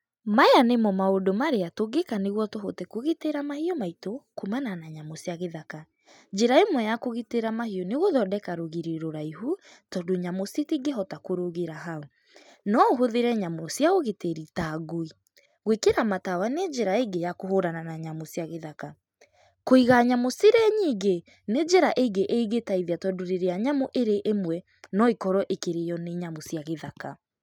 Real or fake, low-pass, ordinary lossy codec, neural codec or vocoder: real; 19.8 kHz; none; none